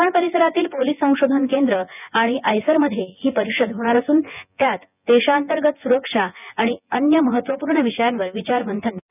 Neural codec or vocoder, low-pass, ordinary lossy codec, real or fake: vocoder, 24 kHz, 100 mel bands, Vocos; 3.6 kHz; none; fake